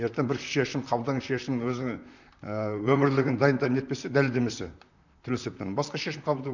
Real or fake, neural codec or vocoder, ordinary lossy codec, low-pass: fake; vocoder, 44.1 kHz, 128 mel bands, Pupu-Vocoder; none; 7.2 kHz